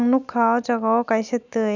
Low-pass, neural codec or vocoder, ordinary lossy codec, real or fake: 7.2 kHz; none; none; real